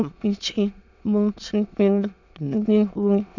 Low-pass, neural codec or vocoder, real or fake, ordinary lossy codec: 7.2 kHz; autoencoder, 22.05 kHz, a latent of 192 numbers a frame, VITS, trained on many speakers; fake; none